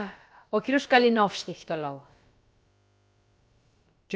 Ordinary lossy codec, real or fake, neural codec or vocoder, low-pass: none; fake; codec, 16 kHz, about 1 kbps, DyCAST, with the encoder's durations; none